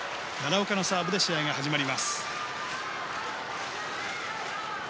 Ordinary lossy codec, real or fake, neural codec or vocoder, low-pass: none; real; none; none